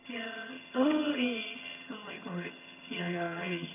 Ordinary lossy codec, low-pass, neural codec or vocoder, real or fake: none; 3.6 kHz; vocoder, 22.05 kHz, 80 mel bands, HiFi-GAN; fake